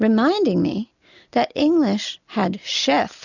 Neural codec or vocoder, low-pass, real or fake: none; 7.2 kHz; real